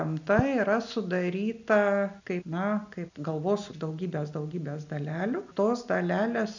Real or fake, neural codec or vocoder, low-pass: real; none; 7.2 kHz